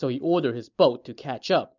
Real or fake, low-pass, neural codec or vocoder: real; 7.2 kHz; none